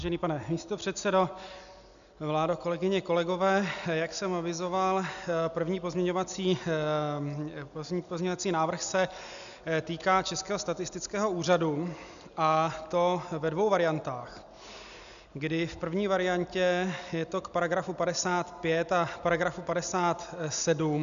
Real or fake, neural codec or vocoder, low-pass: real; none; 7.2 kHz